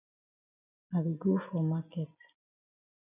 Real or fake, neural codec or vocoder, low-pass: real; none; 3.6 kHz